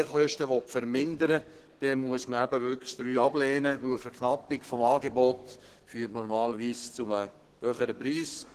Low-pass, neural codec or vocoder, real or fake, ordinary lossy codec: 14.4 kHz; codec, 32 kHz, 1.9 kbps, SNAC; fake; Opus, 16 kbps